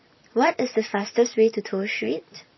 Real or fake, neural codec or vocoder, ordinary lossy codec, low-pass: fake; vocoder, 44.1 kHz, 128 mel bands every 256 samples, BigVGAN v2; MP3, 24 kbps; 7.2 kHz